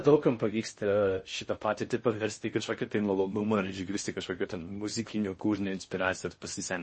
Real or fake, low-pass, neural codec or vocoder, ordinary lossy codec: fake; 10.8 kHz; codec, 16 kHz in and 24 kHz out, 0.6 kbps, FocalCodec, streaming, 4096 codes; MP3, 32 kbps